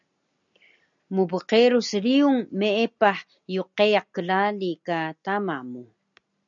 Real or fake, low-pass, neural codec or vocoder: real; 7.2 kHz; none